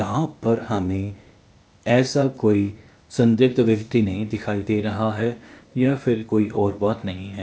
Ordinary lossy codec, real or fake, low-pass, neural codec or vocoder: none; fake; none; codec, 16 kHz, 0.8 kbps, ZipCodec